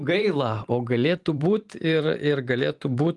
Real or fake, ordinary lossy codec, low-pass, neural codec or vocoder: fake; Opus, 24 kbps; 10.8 kHz; vocoder, 44.1 kHz, 128 mel bands every 512 samples, BigVGAN v2